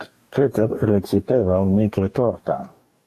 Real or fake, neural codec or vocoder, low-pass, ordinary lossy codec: fake; codec, 44.1 kHz, 2.6 kbps, DAC; 14.4 kHz; AAC, 64 kbps